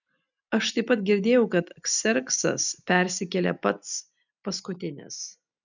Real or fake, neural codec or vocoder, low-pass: real; none; 7.2 kHz